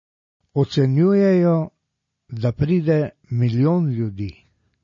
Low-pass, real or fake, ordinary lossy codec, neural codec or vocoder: 7.2 kHz; real; MP3, 32 kbps; none